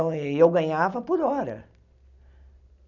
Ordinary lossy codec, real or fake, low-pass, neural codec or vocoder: none; real; 7.2 kHz; none